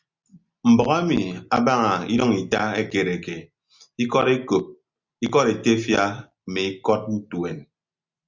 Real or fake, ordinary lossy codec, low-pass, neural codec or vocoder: real; Opus, 64 kbps; 7.2 kHz; none